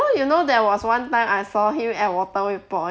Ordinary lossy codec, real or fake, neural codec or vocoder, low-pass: none; real; none; none